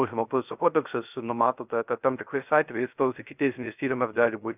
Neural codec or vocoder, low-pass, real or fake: codec, 16 kHz, 0.3 kbps, FocalCodec; 3.6 kHz; fake